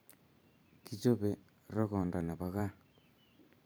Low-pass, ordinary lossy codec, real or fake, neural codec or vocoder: none; none; fake; vocoder, 44.1 kHz, 128 mel bands every 512 samples, BigVGAN v2